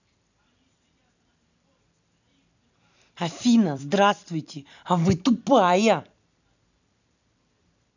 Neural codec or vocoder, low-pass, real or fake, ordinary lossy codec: vocoder, 44.1 kHz, 128 mel bands every 256 samples, BigVGAN v2; 7.2 kHz; fake; none